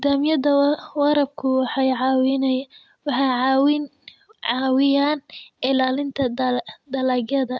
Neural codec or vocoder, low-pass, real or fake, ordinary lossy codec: none; none; real; none